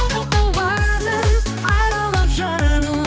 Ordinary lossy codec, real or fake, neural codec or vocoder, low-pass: none; fake; codec, 16 kHz, 2 kbps, X-Codec, HuBERT features, trained on balanced general audio; none